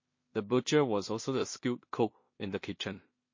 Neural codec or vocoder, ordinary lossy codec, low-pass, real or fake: codec, 16 kHz in and 24 kHz out, 0.4 kbps, LongCat-Audio-Codec, two codebook decoder; MP3, 32 kbps; 7.2 kHz; fake